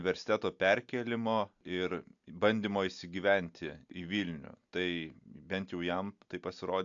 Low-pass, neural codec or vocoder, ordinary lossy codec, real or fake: 7.2 kHz; none; AAC, 64 kbps; real